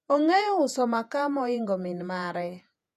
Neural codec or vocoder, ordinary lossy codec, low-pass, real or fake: vocoder, 48 kHz, 128 mel bands, Vocos; none; 14.4 kHz; fake